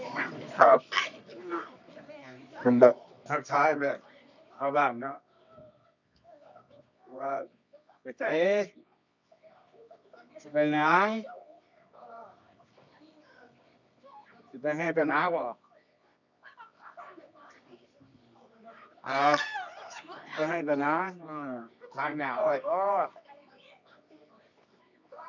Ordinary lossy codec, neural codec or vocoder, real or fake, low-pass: none; codec, 24 kHz, 0.9 kbps, WavTokenizer, medium music audio release; fake; 7.2 kHz